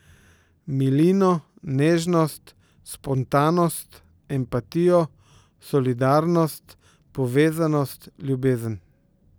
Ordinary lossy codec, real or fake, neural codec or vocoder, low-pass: none; real; none; none